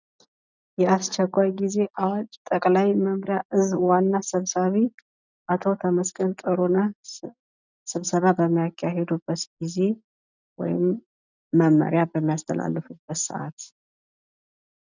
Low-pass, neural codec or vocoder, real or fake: 7.2 kHz; none; real